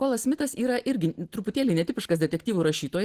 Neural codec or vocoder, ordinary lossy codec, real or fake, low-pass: none; Opus, 16 kbps; real; 14.4 kHz